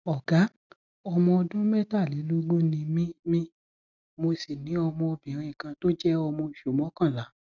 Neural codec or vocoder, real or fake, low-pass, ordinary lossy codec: none; real; 7.2 kHz; none